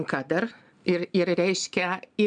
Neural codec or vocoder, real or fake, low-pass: none; real; 9.9 kHz